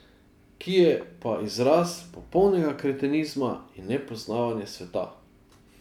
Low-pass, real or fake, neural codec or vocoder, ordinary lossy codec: 19.8 kHz; real; none; none